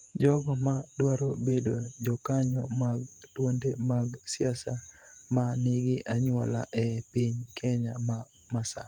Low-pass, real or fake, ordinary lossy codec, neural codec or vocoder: 19.8 kHz; fake; Opus, 32 kbps; vocoder, 48 kHz, 128 mel bands, Vocos